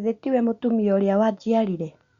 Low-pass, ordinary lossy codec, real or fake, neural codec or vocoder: 7.2 kHz; Opus, 64 kbps; real; none